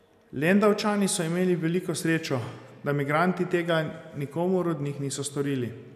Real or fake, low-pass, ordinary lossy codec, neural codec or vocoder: real; 14.4 kHz; none; none